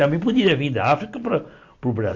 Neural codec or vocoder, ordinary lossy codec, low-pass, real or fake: none; MP3, 48 kbps; 7.2 kHz; real